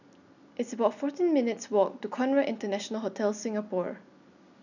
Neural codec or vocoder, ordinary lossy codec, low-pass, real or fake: none; none; 7.2 kHz; real